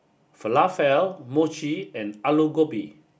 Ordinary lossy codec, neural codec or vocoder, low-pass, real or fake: none; none; none; real